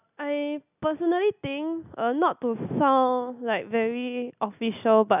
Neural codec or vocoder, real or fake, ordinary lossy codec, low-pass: none; real; none; 3.6 kHz